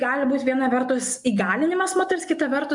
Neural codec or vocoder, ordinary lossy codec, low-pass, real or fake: none; MP3, 64 kbps; 10.8 kHz; real